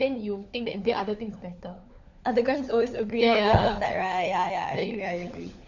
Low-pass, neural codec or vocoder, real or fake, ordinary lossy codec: 7.2 kHz; codec, 16 kHz, 4 kbps, FunCodec, trained on LibriTTS, 50 frames a second; fake; none